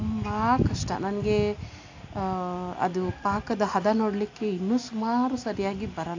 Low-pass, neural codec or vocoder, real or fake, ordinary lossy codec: 7.2 kHz; none; real; AAC, 48 kbps